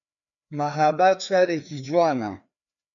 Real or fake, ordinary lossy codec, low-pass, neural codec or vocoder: fake; MP3, 96 kbps; 7.2 kHz; codec, 16 kHz, 2 kbps, FreqCodec, larger model